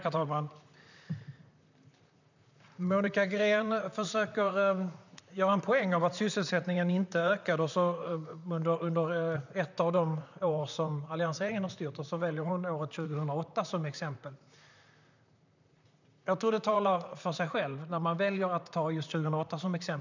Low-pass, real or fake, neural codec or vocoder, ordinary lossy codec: 7.2 kHz; fake; vocoder, 44.1 kHz, 128 mel bands, Pupu-Vocoder; none